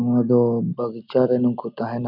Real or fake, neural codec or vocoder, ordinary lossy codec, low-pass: real; none; none; 5.4 kHz